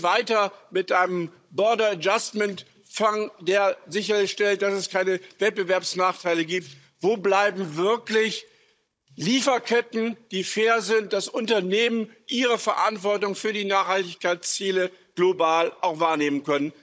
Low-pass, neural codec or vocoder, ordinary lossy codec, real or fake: none; codec, 16 kHz, 16 kbps, FunCodec, trained on Chinese and English, 50 frames a second; none; fake